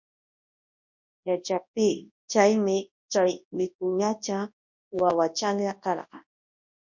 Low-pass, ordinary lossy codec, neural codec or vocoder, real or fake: 7.2 kHz; MP3, 48 kbps; codec, 24 kHz, 0.9 kbps, WavTokenizer, large speech release; fake